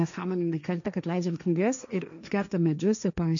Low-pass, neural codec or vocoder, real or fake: 7.2 kHz; codec, 16 kHz, 1.1 kbps, Voila-Tokenizer; fake